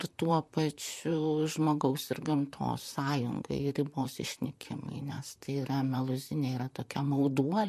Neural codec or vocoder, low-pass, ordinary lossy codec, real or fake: vocoder, 44.1 kHz, 128 mel bands, Pupu-Vocoder; 14.4 kHz; MP3, 64 kbps; fake